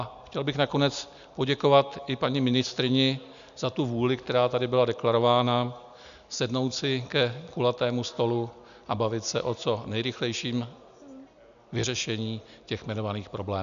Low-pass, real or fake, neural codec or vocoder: 7.2 kHz; real; none